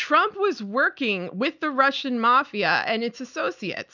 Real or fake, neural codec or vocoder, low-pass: real; none; 7.2 kHz